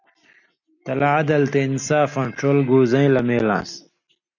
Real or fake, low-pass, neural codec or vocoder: real; 7.2 kHz; none